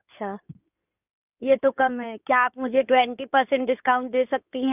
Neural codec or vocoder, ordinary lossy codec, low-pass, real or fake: codec, 16 kHz in and 24 kHz out, 2.2 kbps, FireRedTTS-2 codec; none; 3.6 kHz; fake